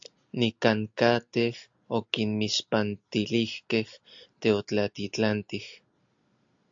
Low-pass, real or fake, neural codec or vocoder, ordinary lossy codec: 7.2 kHz; real; none; AAC, 64 kbps